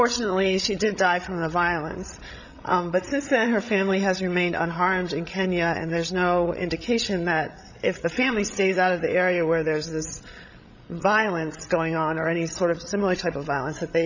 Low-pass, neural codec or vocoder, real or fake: 7.2 kHz; codec, 16 kHz, 16 kbps, FreqCodec, larger model; fake